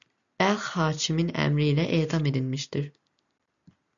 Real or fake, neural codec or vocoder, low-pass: real; none; 7.2 kHz